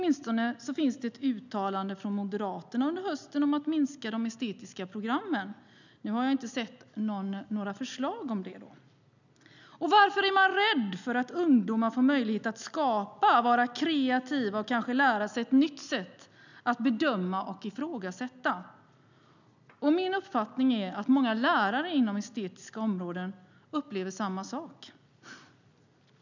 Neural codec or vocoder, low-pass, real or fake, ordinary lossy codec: none; 7.2 kHz; real; none